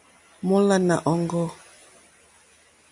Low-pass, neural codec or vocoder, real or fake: 10.8 kHz; none; real